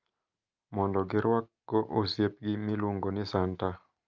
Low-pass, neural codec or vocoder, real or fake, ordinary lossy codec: 7.2 kHz; none; real; Opus, 24 kbps